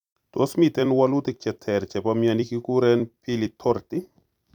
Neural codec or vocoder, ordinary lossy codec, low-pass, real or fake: vocoder, 44.1 kHz, 128 mel bands every 256 samples, BigVGAN v2; none; 19.8 kHz; fake